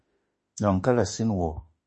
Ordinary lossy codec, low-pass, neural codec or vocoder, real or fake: MP3, 32 kbps; 10.8 kHz; autoencoder, 48 kHz, 32 numbers a frame, DAC-VAE, trained on Japanese speech; fake